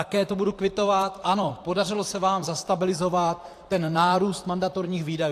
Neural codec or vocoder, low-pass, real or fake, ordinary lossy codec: vocoder, 44.1 kHz, 128 mel bands, Pupu-Vocoder; 14.4 kHz; fake; AAC, 64 kbps